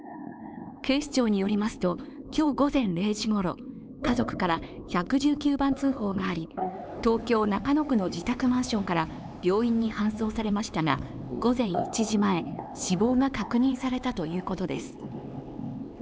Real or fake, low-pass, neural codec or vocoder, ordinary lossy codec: fake; none; codec, 16 kHz, 4 kbps, X-Codec, HuBERT features, trained on LibriSpeech; none